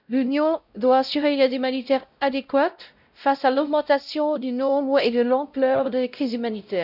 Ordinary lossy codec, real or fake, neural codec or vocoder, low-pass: none; fake; codec, 16 kHz, 0.5 kbps, X-Codec, WavLM features, trained on Multilingual LibriSpeech; 5.4 kHz